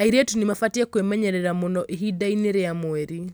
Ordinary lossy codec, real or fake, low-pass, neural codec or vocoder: none; real; none; none